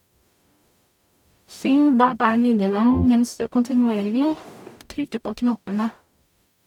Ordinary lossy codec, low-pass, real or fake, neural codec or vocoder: none; 19.8 kHz; fake; codec, 44.1 kHz, 0.9 kbps, DAC